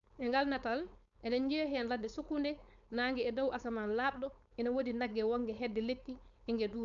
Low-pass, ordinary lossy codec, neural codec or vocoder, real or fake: 7.2 kHz; none; codec, 16 kHz, 4.8 kbps, FACodec; fake